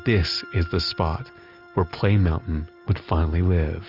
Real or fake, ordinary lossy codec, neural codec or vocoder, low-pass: real; Opus, 24 kbps; none; 5.4 kHz